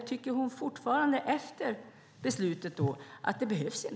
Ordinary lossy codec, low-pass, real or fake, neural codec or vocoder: none; none; real; none